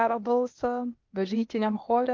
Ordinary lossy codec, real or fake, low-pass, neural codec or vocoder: Opus, 32 kbps; fake; 7.2 kHz; codec, 16 kHz, 0.8 kbps, ZipCodec